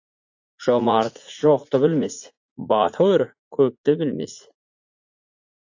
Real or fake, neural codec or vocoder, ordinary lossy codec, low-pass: fake; vocoder, 44.1 kHz, 80 mel bands, Vocos; AAC, 48 kbps; 7.2 kHz